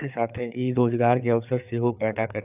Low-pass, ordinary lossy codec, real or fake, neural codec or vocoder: 3.6 kHz; none; fake; codec, 16 kHz, 4 kbps, FreqCodec, larger model